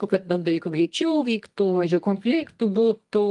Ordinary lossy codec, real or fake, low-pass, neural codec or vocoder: Opus, 32 kbps; fake; 10.8 kHz; codec, 24 kHz, 0.9 kbps, WavTokenizer, medium music audio release